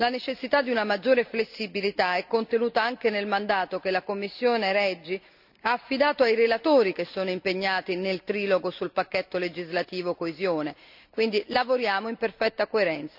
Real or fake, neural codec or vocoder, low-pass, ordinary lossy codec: real; none; 5.4 kHz; none